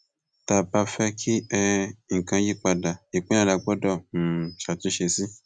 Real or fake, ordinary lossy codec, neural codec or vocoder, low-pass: real; none; none; 9.9 kHz